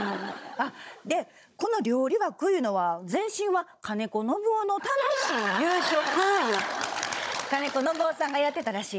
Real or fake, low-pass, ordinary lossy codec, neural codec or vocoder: fake; none; none; codec, 16 kHz, 16 kbps, FunCodec, trained on Chinese and English, 50 frames a second